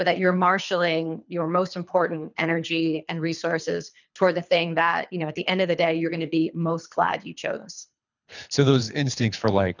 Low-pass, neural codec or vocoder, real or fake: 7.2 kHz; codec, 24 kHz, 3 kbps, HILCodec; fake